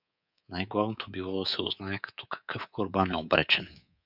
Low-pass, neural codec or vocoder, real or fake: 5.4 kHz; codec, 24 kHz, 3.1 kbps, DualCodec; fake